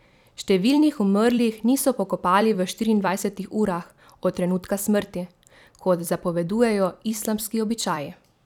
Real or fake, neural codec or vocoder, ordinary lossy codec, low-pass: fake; vocoder, 44.1 kHz, 128 mel bands every 256 samples, BigVGAN v2; none; 19.8 kHz